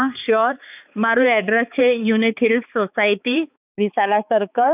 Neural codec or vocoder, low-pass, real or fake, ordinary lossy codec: codec, 16 kHz, 4 kbps, X-Codec, HuBERT features, trained on balanced general audio; 3.6 kHz; fake; none